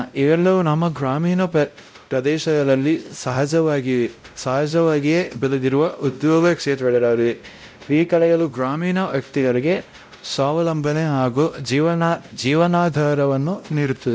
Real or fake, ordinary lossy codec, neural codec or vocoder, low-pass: fake; none; codec, 16 kHz, 0.5 kbps, X-Codec, WavLM features, trained on Multilingual LibriSpeech; none